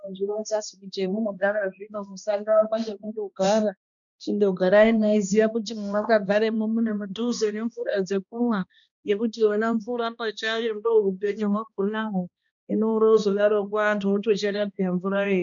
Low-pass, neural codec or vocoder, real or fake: 7.2 kHz; codec, 16 kHz, 1 kbps, X-Codec, HuBERT features, trained on balanced general audio; fake